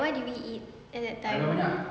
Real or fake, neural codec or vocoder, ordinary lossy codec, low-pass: real; none; none; none